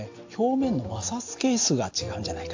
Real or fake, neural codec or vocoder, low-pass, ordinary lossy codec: real; none; 7.2 kHz; AAC, 48 kbps